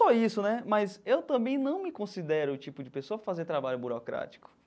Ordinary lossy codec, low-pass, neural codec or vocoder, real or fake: none; none; none; real